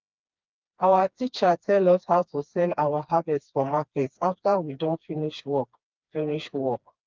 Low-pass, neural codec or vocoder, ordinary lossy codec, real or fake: 7.2 kHz; codec, 16 kHz, 2 kbps, FreqCodec, smaller model; Opus, 32 kbps; fake